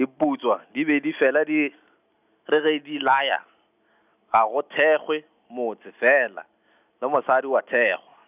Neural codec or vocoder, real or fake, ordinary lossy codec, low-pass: none; real; none; 3.6 kHz